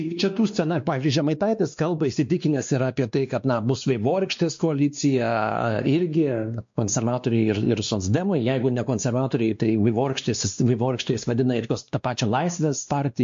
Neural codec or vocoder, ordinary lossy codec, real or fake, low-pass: codec, 16 kHz, 2 kbps, X-Codec, WavLM features, trained on Multilingual LibriSpeech; MP3, 48 kbps; fake; 7.2 kHz